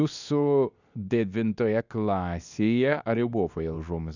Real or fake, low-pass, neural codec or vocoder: fake; 7.2 kHz; codec, 24 kHz, 0.9 kbps, WavTokenizer, medium speech release version 2